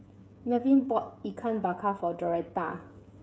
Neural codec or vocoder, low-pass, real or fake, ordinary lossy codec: codec, 16 kHz, 16 kbps, FreqCodec, smaller model; none; fake; none